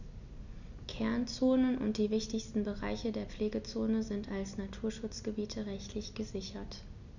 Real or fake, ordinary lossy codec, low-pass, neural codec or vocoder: real; none; 7.2 kHz; none